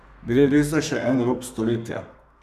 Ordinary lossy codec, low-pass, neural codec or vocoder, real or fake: none; 14.4 kHz; codec, 32 kHz, 1.9 kbps, SNAC; fake